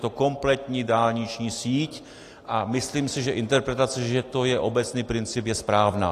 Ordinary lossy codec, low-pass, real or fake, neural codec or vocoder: AAC, 48 kbps; 14.4 kHz; real; none